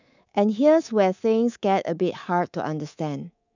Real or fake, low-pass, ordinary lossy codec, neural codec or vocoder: fake; 7.2 kHz; none; codec, 24 kHz, 3.1 kbps, DualCodec